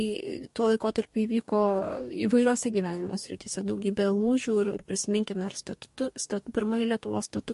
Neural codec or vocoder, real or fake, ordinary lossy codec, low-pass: codec, 44.1 kHz, 2.6 kbps, DAC; fake; MP3, 48 kbps; 14.4 kHz